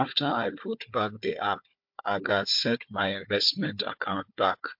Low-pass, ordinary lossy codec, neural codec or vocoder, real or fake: 5.4 kHz; MP3, 48 kbps; codec, 16 kHz, 4 kbps, FunCodec, trained on Chinese and English, 50 frames a second; fake